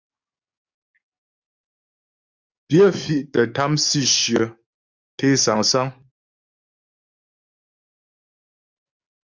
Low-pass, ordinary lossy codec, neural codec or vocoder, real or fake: 7.2 kHz; Opus, 64 kbps; codec, 44.1 kHz, 7.8 kbps, DAC; fake